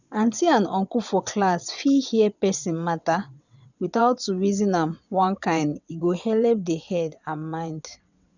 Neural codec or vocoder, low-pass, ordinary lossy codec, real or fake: vocoder, 22.05 kHz, 80 mel bands, WaveNeXt; 7.2 kHz; none; fake